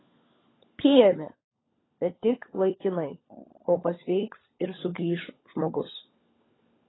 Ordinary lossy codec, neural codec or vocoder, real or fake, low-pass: AAC, 16 kbps; codec, 16 kHz, 16 kbps, FunCodec, trained on LibriTTS, 50 frames a second; fake; 7.2 kHz